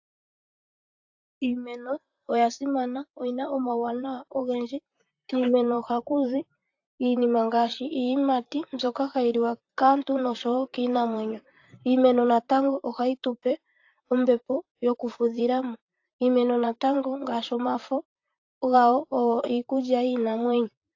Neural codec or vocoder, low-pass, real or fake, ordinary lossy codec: vocoder, 44.1 kHz, 128 mel bands, Pupu-Vocoder; 7.2 kHz; fake; AAC, 48 kbps